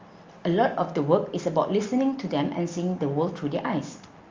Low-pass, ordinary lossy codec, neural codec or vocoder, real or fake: 7.2 kHz; Opus, 32 kbps; none; real